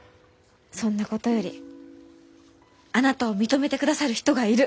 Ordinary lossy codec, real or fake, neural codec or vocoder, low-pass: none; real; none; none